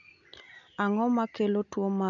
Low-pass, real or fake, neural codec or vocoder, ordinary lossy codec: 7.2 kHz; real; none; none